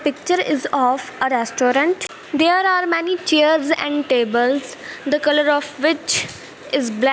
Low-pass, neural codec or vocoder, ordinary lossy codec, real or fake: none; none; none; real